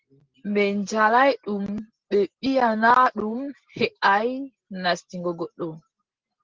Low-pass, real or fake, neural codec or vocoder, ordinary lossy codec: 7.2 kHz; real; none; Opus, 16 kbps